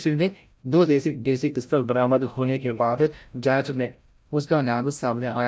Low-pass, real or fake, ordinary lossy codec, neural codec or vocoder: none; fake; none; codec, 16 kHz, 0.5 kbps, FreqCodec, larger model